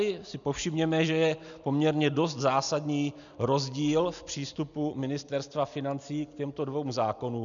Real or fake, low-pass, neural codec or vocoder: real; 7.2 kHz; none